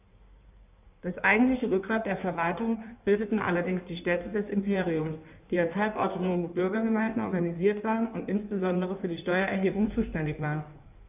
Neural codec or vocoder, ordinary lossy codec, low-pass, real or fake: codec, 16 kHz in and 24 kHz out, 1.1 kbps, FireRedTTS-2 codec; none; 3.6 kHz; fake